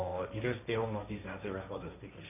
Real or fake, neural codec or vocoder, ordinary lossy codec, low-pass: fake; codec, 16 kHz, 1.1 kbps, Voila-Tokenizer; none; 3.6 kHz